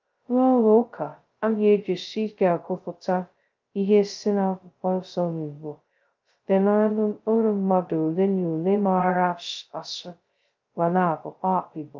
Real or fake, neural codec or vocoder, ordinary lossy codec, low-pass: fake; codec, 16 kHz, 0.2 kbps, FocalCodec; Opus, 24 kbps; 7.2 kHz